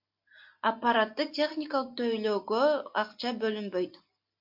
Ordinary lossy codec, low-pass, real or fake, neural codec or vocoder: MP3, 48 kbps; 5.4 kHz; real; none